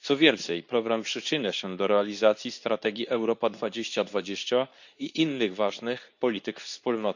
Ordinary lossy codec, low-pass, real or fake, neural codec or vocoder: none; 7.2 kHz; fake; codec, 24 kHz, 0.9 kbps, WavTokenizer, medium speech release version 2